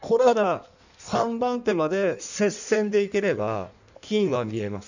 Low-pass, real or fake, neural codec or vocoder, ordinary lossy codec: 7.2 kHz; fake; codec, 16 kHz in and 24 kHz out, 1.1 kbps, FireRedTTS-2 codec; none